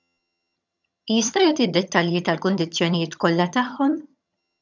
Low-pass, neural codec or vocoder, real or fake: 7.2 kHz; vocoder, 22.05 kHz, 80 mel bands, HiFi-GAN; fake